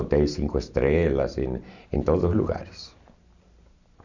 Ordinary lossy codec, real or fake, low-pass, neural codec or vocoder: none; real; 7.2 kHz; none